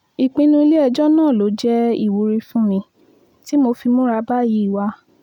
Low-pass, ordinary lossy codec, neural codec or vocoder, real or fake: 19.8 kHz; none; none; real